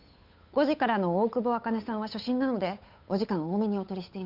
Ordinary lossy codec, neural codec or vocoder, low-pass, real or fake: none; codec, 16 kHz, 8 kbps, FunCodec, trained on Chinese and English, 25 frames a second; 5.4 kHz; fake